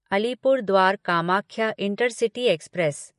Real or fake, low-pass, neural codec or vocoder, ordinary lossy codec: real; 14.4 kHz; none; MP3, 48 kbps